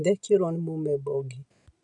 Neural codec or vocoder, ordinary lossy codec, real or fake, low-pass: none; none; real; 10.8 kHz